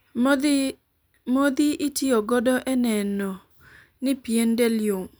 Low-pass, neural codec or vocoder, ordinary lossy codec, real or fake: none; vocoder, 44.1 kHz, 128 mel bands every 256 samples, BigVGAN v2; none; fake